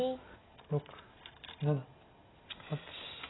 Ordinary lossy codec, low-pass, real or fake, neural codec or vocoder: AAC, 16 kbps; 7.2 kHz; real; none